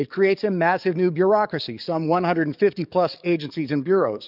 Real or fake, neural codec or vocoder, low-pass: fake; codec, 44.1 kHz, 7.8 kbps, DAC; 5.4 kHz